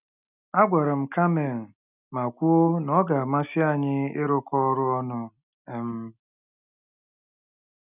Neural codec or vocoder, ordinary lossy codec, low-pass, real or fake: none; none; 3.6 kHz; real